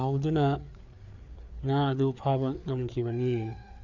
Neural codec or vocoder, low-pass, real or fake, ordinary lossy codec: codec, 16 kHz, 4 kbps, FreqCodec, larger model; 7.2 kHz; fake; none